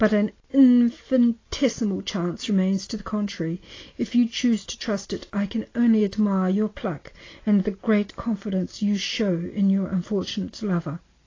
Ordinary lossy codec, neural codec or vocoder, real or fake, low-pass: AAC, 32 kbps; none; real; 7.2 kHz